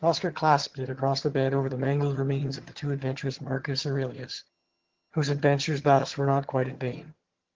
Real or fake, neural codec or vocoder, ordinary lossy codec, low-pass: fake; vocoder, 22.05 kHz, 80 mel bands, HiFi-GAN; Opus, 16 kbps; 7.2 kHz